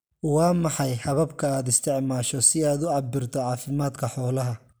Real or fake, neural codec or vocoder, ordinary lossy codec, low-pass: real; none; none; none